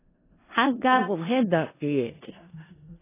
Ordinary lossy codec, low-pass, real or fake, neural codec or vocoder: AAC, 16 kbps; 3.6 kHz; fake; codec, 16 kHz in and 24 kHz out, 0.4 kbps, LongCat-Audio-Codec, four codebook decoder